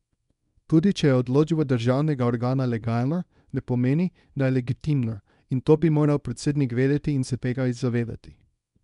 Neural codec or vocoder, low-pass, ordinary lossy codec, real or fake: codec, 24 kHz, 0.9 kbps, WavTokenizer, small release; 10.8 kHz; none; fake